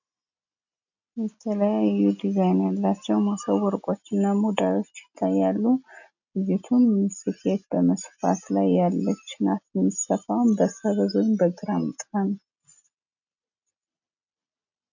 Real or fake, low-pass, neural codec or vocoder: real; 7.2 kHz; none